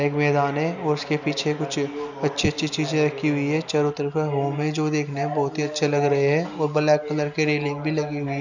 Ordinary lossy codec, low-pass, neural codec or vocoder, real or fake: none; 7.2 kHz; none; real